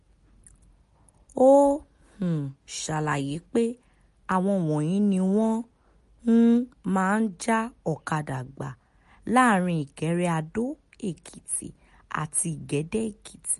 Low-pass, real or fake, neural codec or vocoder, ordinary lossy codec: 14.4 kHz; real; none; MP3, 48 kbps